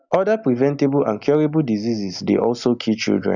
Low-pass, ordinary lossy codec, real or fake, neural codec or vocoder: 7.2 kHz; none; real; none